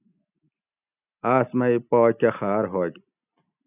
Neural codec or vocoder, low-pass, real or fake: none; 3.6 kHz; real